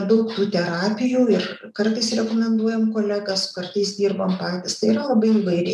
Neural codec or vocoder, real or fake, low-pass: none; real; 14.4 kHz